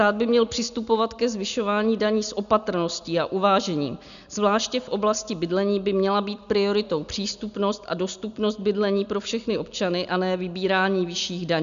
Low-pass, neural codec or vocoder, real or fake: 7.2 kHz; none; real